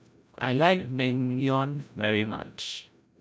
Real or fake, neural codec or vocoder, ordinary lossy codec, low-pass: fake; codec, 16 kHz, 0.5 kbps, FreqCodec, larger model; none; none